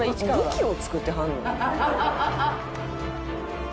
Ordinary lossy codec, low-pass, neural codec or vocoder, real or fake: none; none; none; real